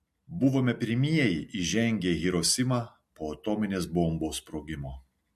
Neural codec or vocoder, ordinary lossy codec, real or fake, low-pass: none; MP3, 64 kbps; real; 14.4 kHz